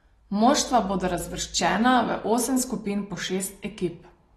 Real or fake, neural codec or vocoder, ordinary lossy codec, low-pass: real; none; AAC, 32 kbps; 19.8 kHz